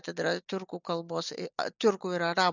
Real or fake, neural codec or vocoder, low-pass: real; none; 7.2 kHz